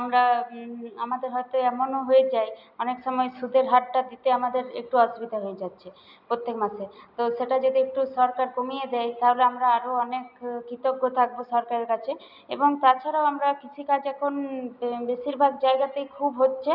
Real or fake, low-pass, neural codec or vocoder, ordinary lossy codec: real; 5.4 kHz; none; none